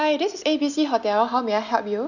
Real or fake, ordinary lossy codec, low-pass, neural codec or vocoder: real; none; 7.2 kHz; none